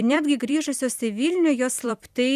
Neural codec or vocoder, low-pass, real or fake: vocoder, 44.1 kHz, 128 mel bands every 256 samples, BigVGAN v2; 14.4 kHz; fake